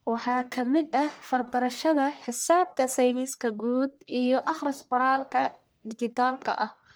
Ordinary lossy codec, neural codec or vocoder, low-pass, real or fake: none; codec, 44.1 kHz, 1.7 kbps, Pupu-Codec; none; fake